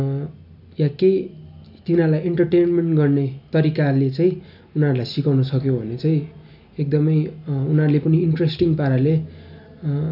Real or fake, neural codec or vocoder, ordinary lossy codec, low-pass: real; none; none; 5.4 kHz